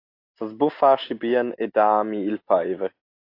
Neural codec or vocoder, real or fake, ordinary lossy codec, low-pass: none; real; AAC, 32 kbps; 5.4 kHz